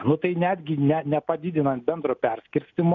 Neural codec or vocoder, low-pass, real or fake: none; 7.2 kHz; real